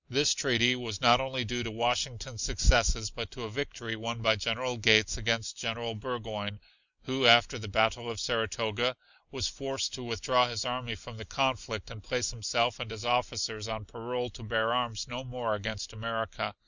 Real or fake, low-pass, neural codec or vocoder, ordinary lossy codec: real; 7.2 kHz; none; Opus, 64 kbps